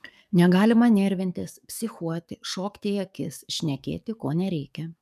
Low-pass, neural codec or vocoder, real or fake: 14.4 kHz; codec, 44.1 kHz, 7.8 kbps, DAC; fake